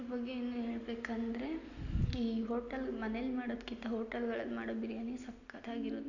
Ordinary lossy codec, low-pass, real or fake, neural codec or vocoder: none; 7.2 kHz; real; none